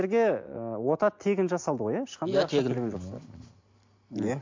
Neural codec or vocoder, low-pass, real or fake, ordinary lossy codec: none; 7.2 kHz; real; MP3, 48 kbps